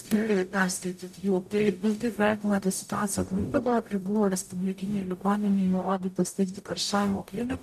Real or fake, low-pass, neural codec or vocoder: fake; 14.4 kHz; codec, 44.1 kHz, 0.9 kbps, DAC